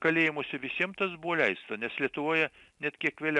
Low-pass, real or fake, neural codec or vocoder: 10.8 kHz; real; none